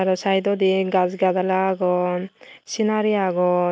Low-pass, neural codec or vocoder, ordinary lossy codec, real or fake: none; none; none; real